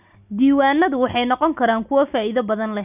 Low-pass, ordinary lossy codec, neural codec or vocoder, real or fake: 3.6 kHz; none; none; real